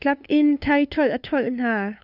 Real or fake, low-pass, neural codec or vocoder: fake; 5.4 kHz; codec, 16 kHz, 2 kbps, FunCodec, trained on LibriTTS, 25 frames a second